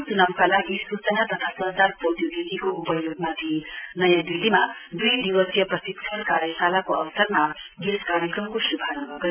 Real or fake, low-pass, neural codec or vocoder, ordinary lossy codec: real; 3.6 kHz; none; none